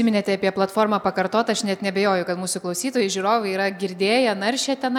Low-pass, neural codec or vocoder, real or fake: 19.8 kHz; none; real